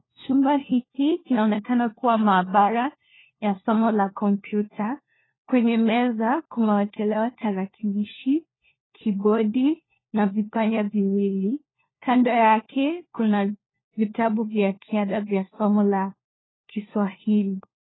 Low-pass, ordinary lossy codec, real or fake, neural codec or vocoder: 7.2 kHz; AAC, 16 kbps; fake; codec, 16 kHz, 1 kbps, FunCodec, trained on LibriTTS, 50 frames a second